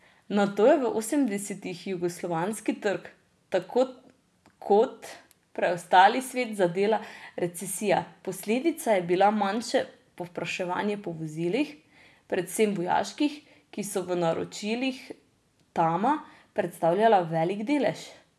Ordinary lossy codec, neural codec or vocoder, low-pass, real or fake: none; none; none; real